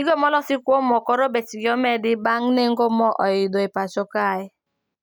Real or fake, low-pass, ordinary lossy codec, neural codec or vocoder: real; none; none; none